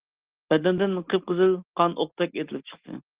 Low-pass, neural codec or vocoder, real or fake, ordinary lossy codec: 3.6 kHz; none; real; Opus, 16 kbps